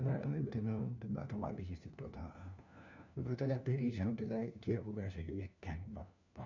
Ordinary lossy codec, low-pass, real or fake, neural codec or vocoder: none; 7.2 kHz; fake; codec, 16 kHz, 1 kbps, FunCodec, trained on LibriTTS, 50 frames a second